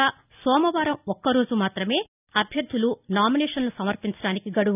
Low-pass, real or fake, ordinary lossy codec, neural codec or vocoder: 3.6 kHz; real; none; none